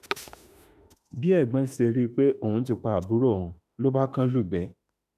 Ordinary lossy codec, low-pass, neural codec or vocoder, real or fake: none; 14.4 kHz; autoencoder, 48 kHz, 32 numbers a frame, DAC-VAE, trained on Japanese speech; fake